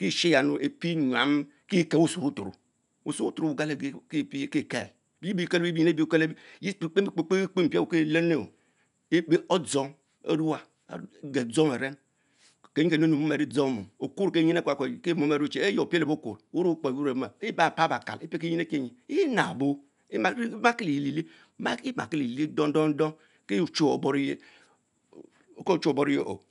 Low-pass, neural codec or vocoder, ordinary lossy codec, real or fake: 10.8 kHz; none; none; real